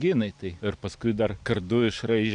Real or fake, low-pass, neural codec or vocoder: real; 9.9 kHz; none